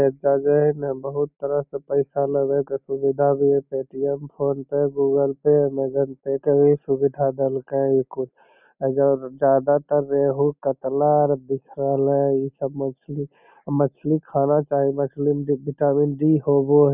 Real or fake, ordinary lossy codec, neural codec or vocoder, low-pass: real; none; none; 3.6 kHz